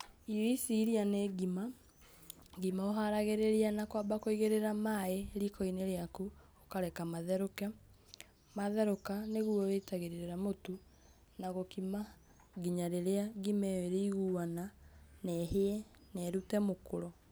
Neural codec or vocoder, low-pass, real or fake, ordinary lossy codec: none; none; real; none